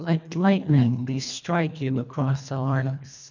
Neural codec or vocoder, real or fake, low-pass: codec, 24 kHz, 1.5 kbps, HILCodec; fake; 7.2 kHz